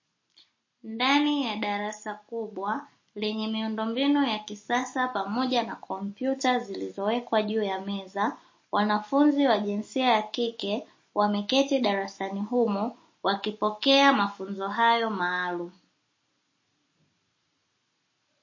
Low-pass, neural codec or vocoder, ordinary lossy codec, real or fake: 7.2 kHz; none; MP3, 32 kbps; real